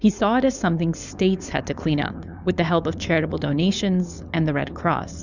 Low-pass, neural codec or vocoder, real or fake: 7.2 kHz; codec, 16 kHz, 4.8 kbps, FACodec; fake